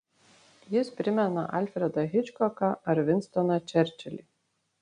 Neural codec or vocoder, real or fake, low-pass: none; real; 9.9 kHz